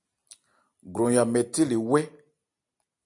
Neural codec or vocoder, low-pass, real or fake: none; 10.8 kHz; real